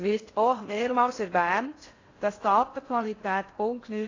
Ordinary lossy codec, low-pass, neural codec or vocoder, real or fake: AAC, 32 kbps; 7.2 kHz; codec, 16 kHz in and 24 kHz out, 0.6 kbps, FocalCodec, streaming, 4096 codes; fake